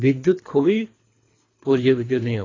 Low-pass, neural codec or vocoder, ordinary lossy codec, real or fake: 7.2 kHz; codec, 24 kHz, 3 kbps, HILCodec; AAC, 32 kbps; fake